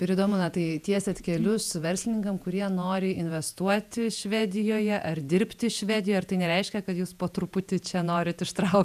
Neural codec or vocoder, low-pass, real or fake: vocoder, 48 kHz, 128 mel bands, Vocos; 14.4 kHz; fake